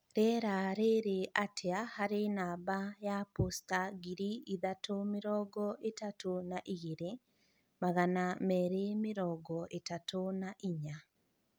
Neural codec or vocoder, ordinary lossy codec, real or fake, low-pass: none; none; real; none